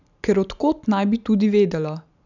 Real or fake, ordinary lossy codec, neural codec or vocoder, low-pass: real; none; none; 7.2 kHz